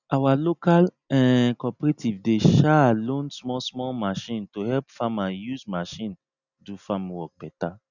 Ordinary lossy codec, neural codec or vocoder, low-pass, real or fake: none; none; 7.2 kHz; real